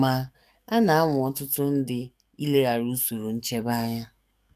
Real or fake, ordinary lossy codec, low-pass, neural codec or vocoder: fake; none; 14.4 kHz; codec, 44.1 kHz, 7.8 kbps, DAC